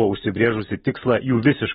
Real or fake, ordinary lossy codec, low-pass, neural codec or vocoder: real; AAC, 16 kbps; 19.8 kHz; none